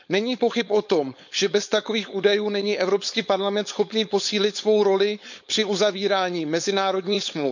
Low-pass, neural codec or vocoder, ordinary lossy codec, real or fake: 7.2 kHz; codec, 16 kHz, 4.8 kbps, FACodec; none; fake